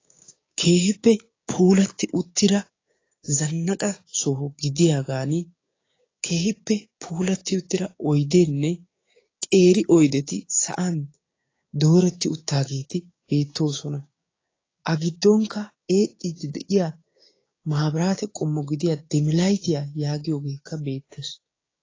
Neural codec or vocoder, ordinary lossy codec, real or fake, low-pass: codec, 16 kHz, 6 kbps, DAC; AAC, 32 kbps; fake; 7.2 kHz